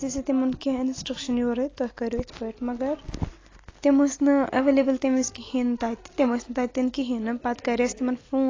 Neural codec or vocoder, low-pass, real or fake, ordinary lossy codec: none; 7.2 kHz; real; AAC, 32 kbps